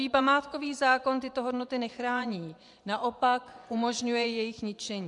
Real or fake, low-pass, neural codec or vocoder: fake; 10.8 kHz; vocoder, 24 kHz, 100 mel bands, Vocos